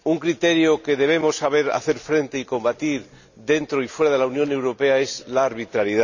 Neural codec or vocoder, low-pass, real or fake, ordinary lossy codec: none; 7.2 kHz; real; none